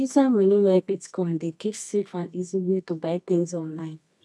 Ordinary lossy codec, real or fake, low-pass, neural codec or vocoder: none; fake; none; codec, 24 kHz, 0.9 kbps, WavTokenizer, medium music audio release